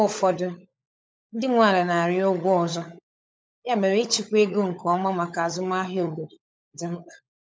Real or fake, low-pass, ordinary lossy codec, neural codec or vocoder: fake; none; none; codec, 16 kHz, 16 kbps, FunCodec, trained on LibriTTS, 50 frames a second